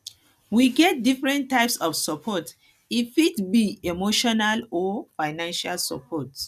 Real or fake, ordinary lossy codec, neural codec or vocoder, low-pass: real; none; none; 14.4 kHz